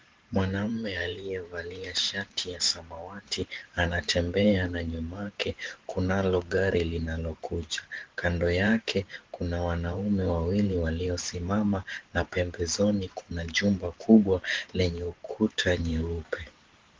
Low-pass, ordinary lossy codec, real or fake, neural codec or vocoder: 7.2 kHz; Opus, 16 kbps; fake; vocoder, 44.1 kHz, 128 mel bands every 512 samples, BigVGAN v2